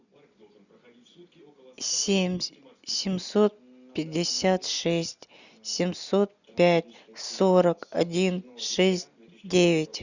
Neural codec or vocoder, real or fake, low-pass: none; real; 7.2 kHz